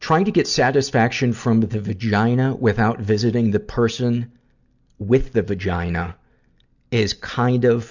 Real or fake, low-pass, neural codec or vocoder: real; 7.2 kHz; none